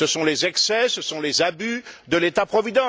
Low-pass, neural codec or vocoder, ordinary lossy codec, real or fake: none; none; none; real